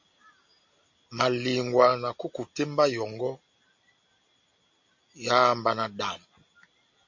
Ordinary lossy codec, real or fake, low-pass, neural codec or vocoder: MP3, 48 kbps; real; 7.2 kHz; none